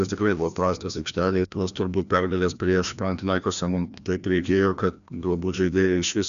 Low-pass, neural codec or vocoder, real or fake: 7.2 kHz; codec, 16 kHz, 1 kbps, FreqCodec, larger model; fake